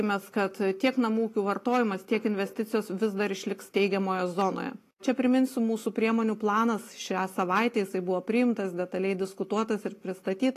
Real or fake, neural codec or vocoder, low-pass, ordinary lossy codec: real; none; 14.4 kHz; AAC, 48 kbps